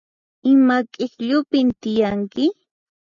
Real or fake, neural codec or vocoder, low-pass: real; none; 7.2 kHz